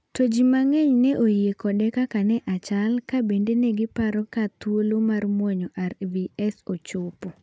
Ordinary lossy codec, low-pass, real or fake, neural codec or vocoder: none; none; real; none